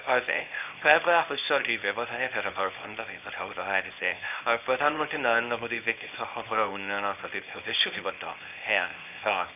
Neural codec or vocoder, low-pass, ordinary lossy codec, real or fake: codec, 24 kHz, 0.9 kbps, WavTokenizer, small release; 3.6 kHz; none; fake